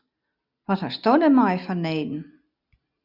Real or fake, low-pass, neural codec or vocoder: real; 5.4 kHz; none